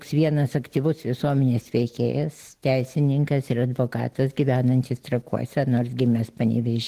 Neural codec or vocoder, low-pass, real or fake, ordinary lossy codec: none; 14.4 kHz; real; Opus, 16 kbps